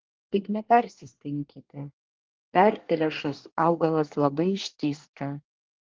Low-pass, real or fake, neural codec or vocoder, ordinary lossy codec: 7.2 kHz; fake; codec, 24 kHz, 1 kbps, SNAC; Opus, 16 kbps